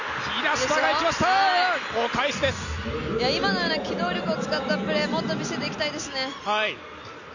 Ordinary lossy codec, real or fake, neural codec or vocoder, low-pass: none; real; none; 7.2 kHz